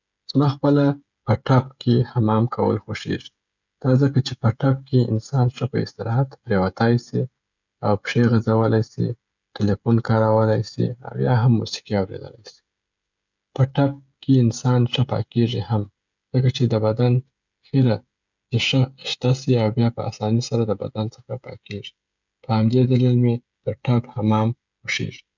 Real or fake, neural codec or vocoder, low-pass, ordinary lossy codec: fake; codec, 16 kHz, 16 kbps, FreqCodec, smaller model; 7.2 kHz; none